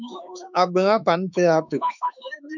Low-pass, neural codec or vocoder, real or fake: 7.2 kHz; autoencoder, 48 kHz, 32 numbers a frame, DAC-VAE, trained on Japanese speech; fake